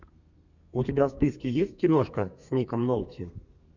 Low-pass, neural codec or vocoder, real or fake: 7.2 kHz; codec, 32 kHz, 1.9 kbps, SNAC; fake